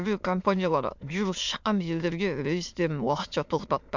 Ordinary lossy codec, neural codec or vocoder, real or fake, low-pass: MP3, 64 kbps; autoencoder, 22.05 kHz, a latent of 192 numbers a frame, VITS, trained on many speakers; fake; 7.2 kHz